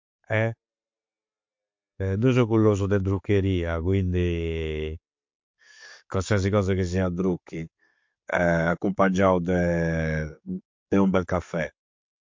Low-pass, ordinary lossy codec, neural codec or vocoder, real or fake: 7.2 kHz; MP3, 48 kbps; none; real